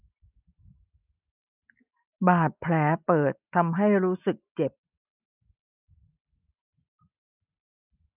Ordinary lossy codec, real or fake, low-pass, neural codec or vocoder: none; real; 3.6 kHz; none